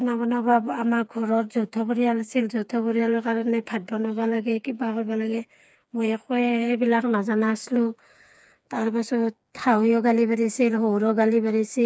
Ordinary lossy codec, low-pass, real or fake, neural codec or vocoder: none; none; fake; codec, 16 kHz, 4 kbps, FreqCodec, smaller model